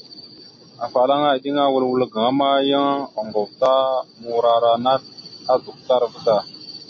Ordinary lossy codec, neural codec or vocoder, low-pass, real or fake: MP3, 32 kbps; none; 7.2 kHz; real